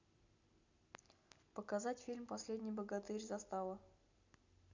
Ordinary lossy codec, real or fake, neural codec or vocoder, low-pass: none; real; none; 7.2 kHz